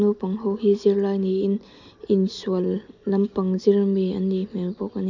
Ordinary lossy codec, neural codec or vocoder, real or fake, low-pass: none; none; real; 7.2 kHz